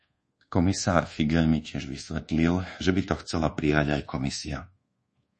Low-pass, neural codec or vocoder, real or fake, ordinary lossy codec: 10.8 kHz; codec, 24 kHz, 1.2 kbps, DualCodec; fake; MP3, 32 kbps